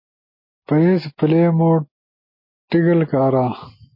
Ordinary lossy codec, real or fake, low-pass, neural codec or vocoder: MP3, 24 kbps; real; 5.4 kHz; none